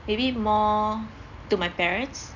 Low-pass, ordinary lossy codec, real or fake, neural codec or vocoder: 7.2 kHz; none; real; none